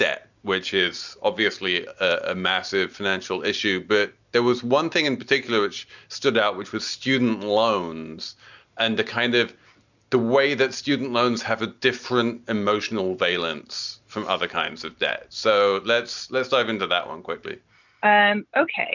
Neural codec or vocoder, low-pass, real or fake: none; 7.2 kHz; real